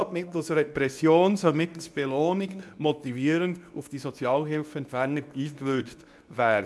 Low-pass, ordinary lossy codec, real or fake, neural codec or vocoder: none; none; fake; codec, 24 kHz, 0.9 kbps, WavTokenizer, medium speech release version 2